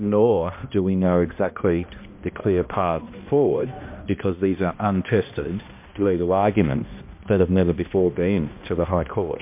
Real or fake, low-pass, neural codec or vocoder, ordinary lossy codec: fake; 3.6 kHz; codec, 16 kHz, 1 kbps, X-Codec, HuBERT features, trained on balanced general audio; MP3, 32 kbps